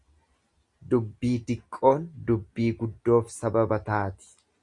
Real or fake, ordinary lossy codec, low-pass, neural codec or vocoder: real; Opus, 64 kbps; 10.8 kHz; none